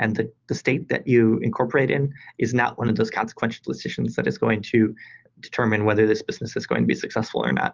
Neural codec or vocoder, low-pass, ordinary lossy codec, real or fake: none; 7.2 kHz; Opus, 24 kbps; real